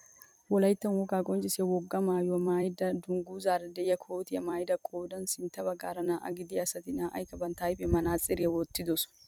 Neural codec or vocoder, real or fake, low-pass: vocoder, 44.1 kHz, 128 mel bands every 256 samples, BigVGAN v2; fake; 19.8 kHz